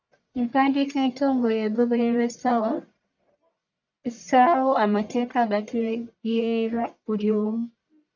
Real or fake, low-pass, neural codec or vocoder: fake; 7.2 kHz; codec, 44.1 kHz, 1.7 kbps, Pupu-Codec